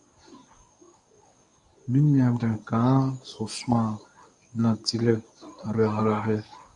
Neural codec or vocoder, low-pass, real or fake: codec, 24 kHz, 0.9 kbps, WavTokenizer, medium speech release version 1; 10.8 kHz; fake